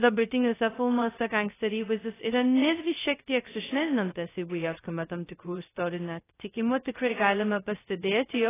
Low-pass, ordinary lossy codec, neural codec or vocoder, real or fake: 3.6 kHz; AAC, 16 kbps; codec, 16 kHz, 0.2 kbps, FocalCodec; fake